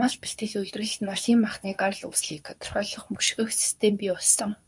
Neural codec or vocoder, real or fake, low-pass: codec, 24 kHz, 0.9 kbps, WavTokenizer, medium speech release version 2; fake; 10.8 kHz